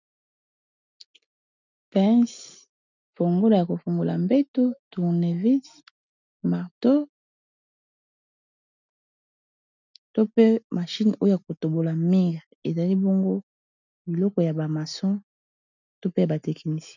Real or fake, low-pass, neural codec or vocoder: real; 7.2 kHz; none